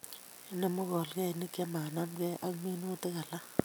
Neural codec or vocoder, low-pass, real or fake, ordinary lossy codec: none; none; real; none